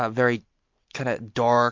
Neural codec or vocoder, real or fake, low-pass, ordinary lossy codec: none; real; 7.2 kHz; MP3, 48 kbps